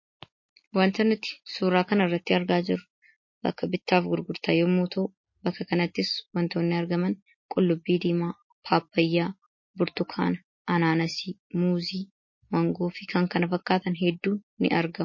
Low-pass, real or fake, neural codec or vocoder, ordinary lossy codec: 7.2 kHz; real; none; MP3, 32 kbps